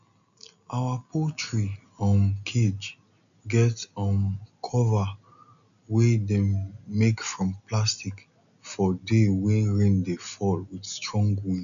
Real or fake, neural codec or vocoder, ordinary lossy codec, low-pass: real; none; none; 7.2 kHz